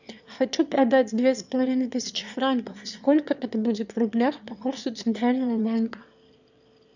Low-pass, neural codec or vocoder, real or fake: 7.2 kHz; autoencoder, 22.05 kHz, a latent of 192 numbers a frame, VITS, trained on one speaker; fake